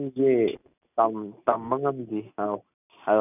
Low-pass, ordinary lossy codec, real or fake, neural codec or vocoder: 3.6 kHz; none; real; none